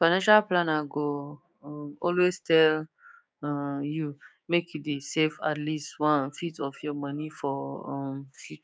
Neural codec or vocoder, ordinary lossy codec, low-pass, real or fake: codec, 16 kHz, 6 kbps, DAC; none; none; fake